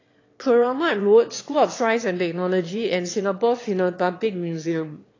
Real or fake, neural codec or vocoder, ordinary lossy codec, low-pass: fake; autoencoder, 22.05 kHz, a latent of 192 numbers a frame, VITS, trained on one speaker; AAC, 32 kbps; 7.2 kHz